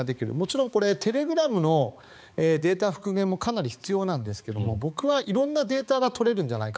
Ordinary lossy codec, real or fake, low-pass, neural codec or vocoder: none; fake; none; codec, 16 kHz, 4 kbps, X-Codec, HuBERT features, trained on balanced general audio